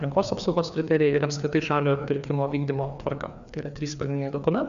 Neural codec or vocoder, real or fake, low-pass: codec, 16 kHz, 2 kbps, FreqCodec, larger model; fake; 7.2 kHz